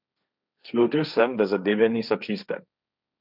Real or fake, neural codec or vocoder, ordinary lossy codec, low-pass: fake; codec, 16 kHz, 1.1 kbps, Voila-Tokenizer; none; 5.4 kHz